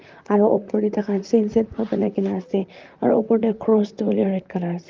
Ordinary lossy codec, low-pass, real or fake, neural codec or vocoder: Opus, 24 kbps; 7.2 kHz; fake; vocoder, 44.1 kHz, 128 mel bands, Pupu-Vocoder